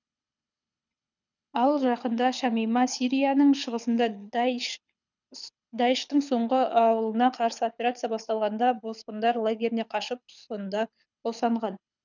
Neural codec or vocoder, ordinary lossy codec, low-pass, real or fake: codec, 24 kHz, 6 kbps, HILCodec; none; 7.2 kHz; fake